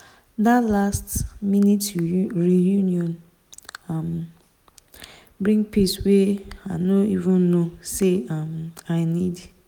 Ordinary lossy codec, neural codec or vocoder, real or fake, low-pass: none; none; real; 19.8 kHz